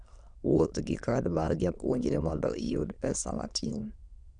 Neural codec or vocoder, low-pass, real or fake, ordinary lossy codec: autoencoder, 22.05 kHz, a latent of 192 numbers a frame, VITS, trained on many speakers; 9.9 kHz; fake; none